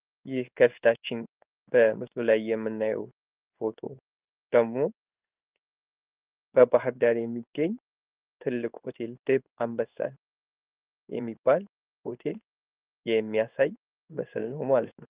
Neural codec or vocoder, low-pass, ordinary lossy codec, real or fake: codec, 16 kHz in and 24 kHz out, 1 kbps, XY-Tokenizer; 3.6 kHz; Opus, 32 kbps; fake